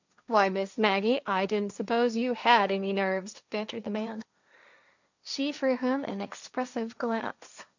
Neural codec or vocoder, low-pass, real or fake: codec, 16 kHz, 1.1 kbps, Voila-Tokenizer; 7.2 kHz; fake